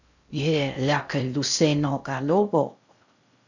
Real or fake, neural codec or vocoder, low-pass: fake; codec, 16 kHz in and 24 kHz out, 0.6 kbps, FocalCodec, streaming, 4096 codes; 7.2 kHz